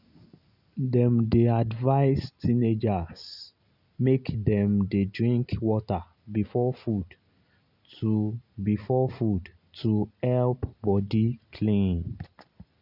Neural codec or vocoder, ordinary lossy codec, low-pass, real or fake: none; none; 5.4 kHz; real